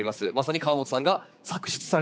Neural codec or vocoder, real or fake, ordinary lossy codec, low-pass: codec, 16 kHz, 4 kbps, X-Codec, HuBERT features, trained on general audio; fake; none; none